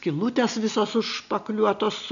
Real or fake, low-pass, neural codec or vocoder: real; 7.2 kHz; none